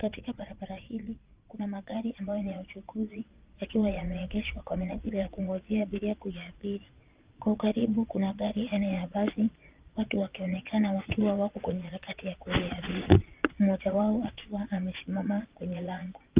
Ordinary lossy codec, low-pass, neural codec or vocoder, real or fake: Opus, 24 kbps; 3.6 kHz; vocoder, 22.05 kHz, 80 mel bands, Vocos; fake